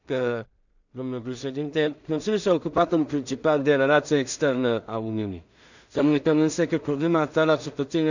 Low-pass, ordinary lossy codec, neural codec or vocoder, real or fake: 7.2 kHz; none; codec, 16 kHz in and 24 kHz out, 0.4 kbps, LongCat-Audio-Codec, two codebook decoder; fake